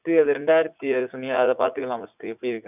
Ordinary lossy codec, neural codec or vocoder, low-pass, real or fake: none; vocoder, 44.1 kHz, 80 mel bands, Vocos; 3.6 kHz; fake